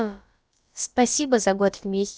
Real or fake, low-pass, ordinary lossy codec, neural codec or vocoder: fake; none; none; codec, 16 kHz, about 1 kbps, DyCAST, with the encoder's durations